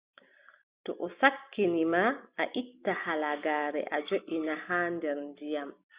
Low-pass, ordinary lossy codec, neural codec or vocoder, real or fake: 3.6 kHz; Opus, 64 kbps; none; real